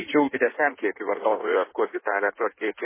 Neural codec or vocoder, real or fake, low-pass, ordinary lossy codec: codec, 16 kHz in and 24 kHz out, 1.1 kbps, FireRedTTS-2 codec; fake; 3.6 kHz; MP3, 16 kbps